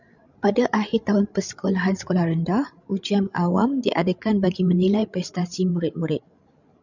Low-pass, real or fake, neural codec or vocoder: 7.2 kHz; fake; codec, 16 kHz, 16 kbps, FreqCodec, larger model